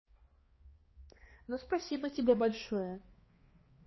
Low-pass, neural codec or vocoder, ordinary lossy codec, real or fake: 7.2 kHz; codec, 16 kHz, 2 kbps, X-Codec, HuBERT features, trained on balanced general audio; MP3, 24 kbps; fake